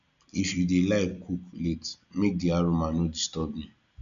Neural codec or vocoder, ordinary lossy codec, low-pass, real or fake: none; none; 7.2 kHz; real